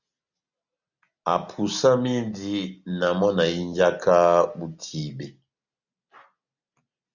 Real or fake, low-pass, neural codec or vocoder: real; 7.2 kHz; none